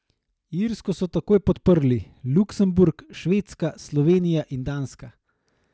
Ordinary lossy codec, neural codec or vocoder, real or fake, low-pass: none; none; real; none